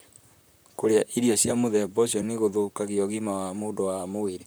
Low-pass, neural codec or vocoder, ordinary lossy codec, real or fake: none; vocoder, 44.1 kHz, 128 mel bands, Pupu-Vocoder; none; fake